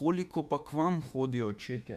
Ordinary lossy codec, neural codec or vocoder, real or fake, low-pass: Opus, 64 kbps; autoencoder, 48 kHz, 32 numbers a frame, DAC-VAE, trained on Japanese speech; fake; 14.4 kHz